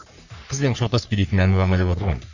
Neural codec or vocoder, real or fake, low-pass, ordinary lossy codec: codec, 44.1 kHz, 3.4 kbps, Pupu-Codec; fake; 7.2 kHz; none